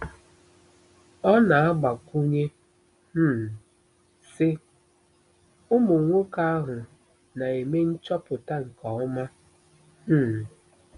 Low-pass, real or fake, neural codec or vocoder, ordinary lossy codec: 10.8 kHz; real; none; none